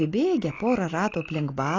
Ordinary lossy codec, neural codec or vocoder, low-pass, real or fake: AAC, 32 kbps; none; 7.2 kHz; real